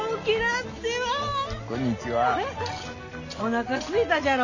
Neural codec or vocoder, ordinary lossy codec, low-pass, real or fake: none; none; 7.2 kHz; real